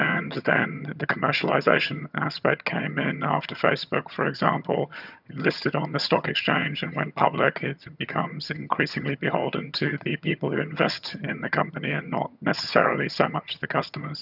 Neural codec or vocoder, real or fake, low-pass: vocoder, 22.05 kHz, 80 mel bands, HiFi-GAN; fake; 5.4 kHz